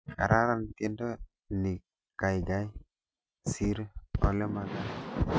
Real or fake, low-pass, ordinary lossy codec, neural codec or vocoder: real; none; none; none